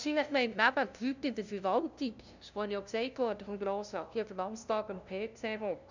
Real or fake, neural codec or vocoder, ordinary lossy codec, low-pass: fake; codec, 16 kHz, 0.5 kbps, FunCodec, trained on LibriTTS, 25 frames a second; none; 7.2 kHz